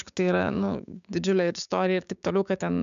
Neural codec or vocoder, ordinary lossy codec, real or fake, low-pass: codec, 16 kHz, 6 kbps, DAC; MP3, 96 kbps; fake; 7.2 kHz